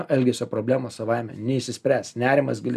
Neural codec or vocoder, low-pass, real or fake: none; 14.4 kHz; real